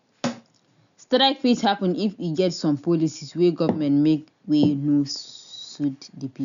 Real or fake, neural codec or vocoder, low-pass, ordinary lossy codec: real; none; 7.2 kHz; none